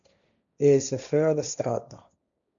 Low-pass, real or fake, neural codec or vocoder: 7.2 kHz; fake; codec, 16 kHz, 1.1 kbps, Voila-Tokenizer